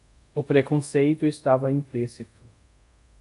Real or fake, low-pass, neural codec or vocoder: fake; 10.8 kHz; codec, 24 kHz, 0.5 kbps, DualCodec